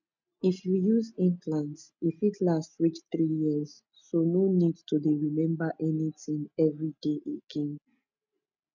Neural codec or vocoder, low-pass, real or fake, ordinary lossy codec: none; 7.2 kHz; real; none